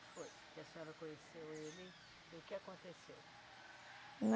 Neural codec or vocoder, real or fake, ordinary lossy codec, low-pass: none; real; none; none